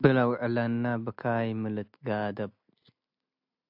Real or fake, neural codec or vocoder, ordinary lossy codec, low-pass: real; none; AAC, 48 kbps; 5.4 kHz